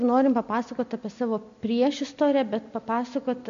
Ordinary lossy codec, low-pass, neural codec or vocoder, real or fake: MP3, 64 kbps; 7.2 kHz; none; real